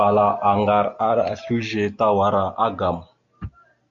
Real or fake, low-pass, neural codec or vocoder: real; 7.2 kHz; none